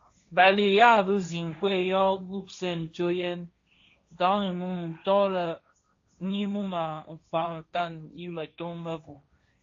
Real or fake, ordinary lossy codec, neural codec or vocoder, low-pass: fake; AAC, 48 kbps; codec, 16 kHz, 1.1 kbps, Voila-Tokenizer; 7.2 kHz